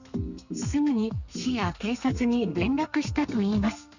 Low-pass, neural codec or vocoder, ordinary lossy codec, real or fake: 7.2 kHz; codec, 32 kHz, 1.9 kbps, SNAC; none; fake